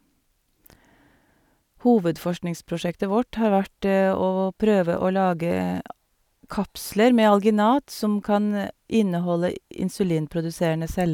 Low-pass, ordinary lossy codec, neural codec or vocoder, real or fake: 19.8 kHz; none; none; real